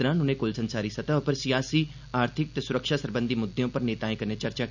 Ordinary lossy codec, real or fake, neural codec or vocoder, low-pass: none; real; none; 7.2 kHz